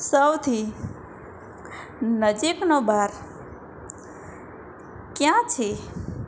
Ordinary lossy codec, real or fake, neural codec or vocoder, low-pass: none; real; none; none